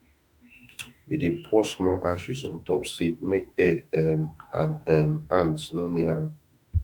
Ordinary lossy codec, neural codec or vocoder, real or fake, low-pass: none; autoencoder, 48 kHz, 32 numbers a frame, DAC-VAE, trained on Japanese speech; fake; 19.8 kHz